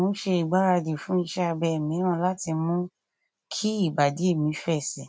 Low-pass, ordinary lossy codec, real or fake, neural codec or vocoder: none; none; real; none